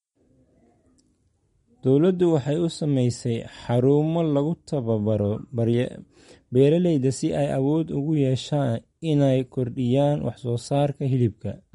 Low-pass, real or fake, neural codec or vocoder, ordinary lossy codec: 19.8 kHz; real; none; MP3, 48 kbps